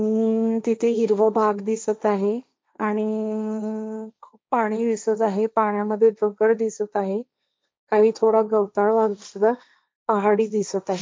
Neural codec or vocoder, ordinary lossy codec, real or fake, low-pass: codec, 16 kHz, 1.1 kbps, Voila-Tokenizer; none; fake; none